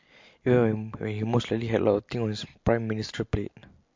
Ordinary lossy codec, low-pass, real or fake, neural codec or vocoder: MP3, 48 kbps; 7.2 kHz; real; none